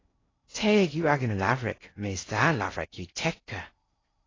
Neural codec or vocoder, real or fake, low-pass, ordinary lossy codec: codec, 16 kHz in and 24 kHz out, 0.6 kbps, FocalCodec, streaming, 2048 codes; fake; 7.2 kHz; AAC, 32 kbps